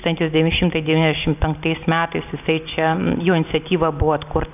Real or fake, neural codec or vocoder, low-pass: real; none; 3.6 kHz